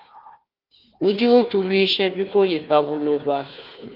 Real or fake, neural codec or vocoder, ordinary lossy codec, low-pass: fake; codec, 16 kHz, 1 kbps, FunCodec, trained on Chinese and English, 50 frames a second; Opus, 24 kbps; 5.4 kHz